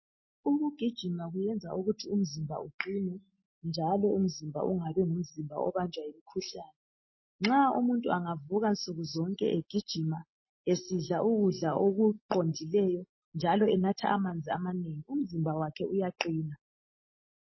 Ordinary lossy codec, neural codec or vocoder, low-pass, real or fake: MP3, 24 kbps; none; 7.2 kHz; real